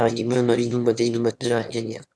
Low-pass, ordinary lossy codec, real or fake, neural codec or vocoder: none; none; fake; autoencoder, 22.05 kHz, a latent of 192 numbers a frame, VITS, trained on one speaker